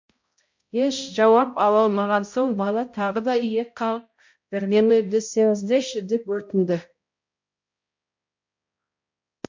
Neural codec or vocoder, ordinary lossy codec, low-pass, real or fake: codec, 16 kHz, 0.5 kbps, X-Codec, HuBERT features, trained on balanced general audio; MP3, 48 kbps; 7.2 kHz; fake